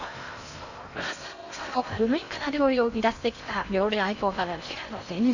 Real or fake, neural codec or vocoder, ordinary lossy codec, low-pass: fake; codec, 16 kHz in and 24 kHz out, 0.6 kbps, FocalCodec, streaming, 2048 codes; none; 7.2 kHz